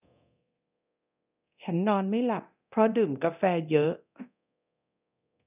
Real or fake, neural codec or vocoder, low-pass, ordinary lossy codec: fake; codec, 24 kHz, 0.9 kbps, DualCodec; 3.6 kHz; none